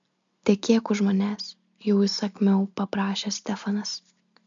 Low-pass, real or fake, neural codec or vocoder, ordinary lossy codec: 7.2 kHz; real; none; MP3, 64 kbps